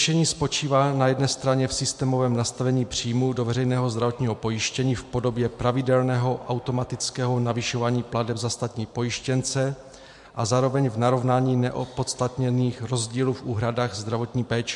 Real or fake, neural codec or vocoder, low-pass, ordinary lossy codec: fake; vocoder, 44.1 kHz, 128 mel bands every 512 samples, BigVGAN v2; 10.8 kHz; MP3, 64 kbps